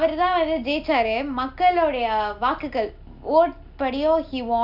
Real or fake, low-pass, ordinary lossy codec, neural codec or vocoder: real; 5.4 kHz; none; none